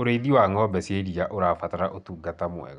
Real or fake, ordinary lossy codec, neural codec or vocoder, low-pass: real; none; none; 10.8 kHz